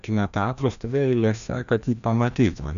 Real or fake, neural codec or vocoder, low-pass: fake; codec, 16 kHz, 1 kbps, FreqCodec, larger model; 7.2 kHz